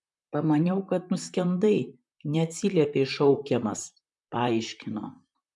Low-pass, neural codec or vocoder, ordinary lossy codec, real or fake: 10.8 kHz; vocoder, 44.1 kHz, 128 mel bands, Pupu-Vocoder; MP3, 96 kbps; fake